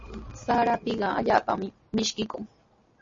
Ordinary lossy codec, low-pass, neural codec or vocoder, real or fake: MP3, 32 kbps; 7.2 kHz; none; real